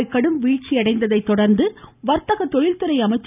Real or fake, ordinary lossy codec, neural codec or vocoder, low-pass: real; none; none; 3.6 kHz